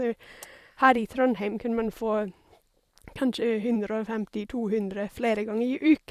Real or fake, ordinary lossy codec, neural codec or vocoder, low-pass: fake; Opus, 64 kbps; vocoder, 44.1 kHz, 128 mel bands every 512 samples, BigVGAN v2; 14.4 kHz